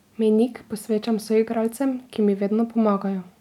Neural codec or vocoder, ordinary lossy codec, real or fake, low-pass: none; none; real; 19.8 kHz